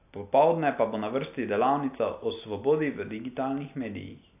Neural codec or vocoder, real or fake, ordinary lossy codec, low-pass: none; real; AAC, 32 kbps; 3.6 kHz